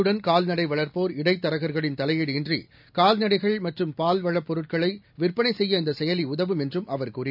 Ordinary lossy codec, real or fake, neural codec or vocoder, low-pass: none; real; none; 5.4 kHz